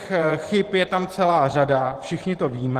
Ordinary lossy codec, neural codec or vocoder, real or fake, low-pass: Opus, 16 kbps; vocoder, 44.1 kHz, 128 mel bands every 512 samples, BigVGAN v2; fake; 14.4 kHz